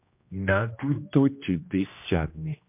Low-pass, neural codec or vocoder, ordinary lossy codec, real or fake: 3.6 kHz; codec, 16 kHz, 1 kbps, X-Codec, HuBERT features, trained on general audio; MP3, 32 kbps; fake